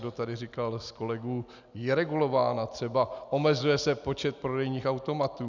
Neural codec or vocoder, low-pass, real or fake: none; 7.2 kHz; real